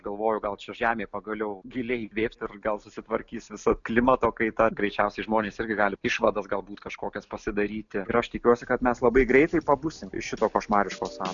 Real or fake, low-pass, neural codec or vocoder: real; 7.2 kHz; none